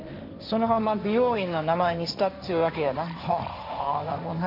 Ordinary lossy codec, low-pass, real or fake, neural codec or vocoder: none; 5.4 kHz; fake; codec, 16 kHz, 1.1 kbps, Voila-Tokenizer